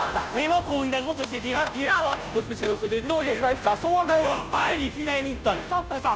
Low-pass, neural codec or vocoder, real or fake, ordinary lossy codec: none; codec, 16 kHz, 0.5 kbps, FunCodec, trained on Chinese and English, 25 frames a second; fake; none